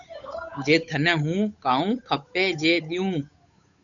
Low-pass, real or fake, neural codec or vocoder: 7.2 kHz; fake; codec, 16 kHz, 8 kbps, FunCodec, trained on Chinese and English, 25 frames a second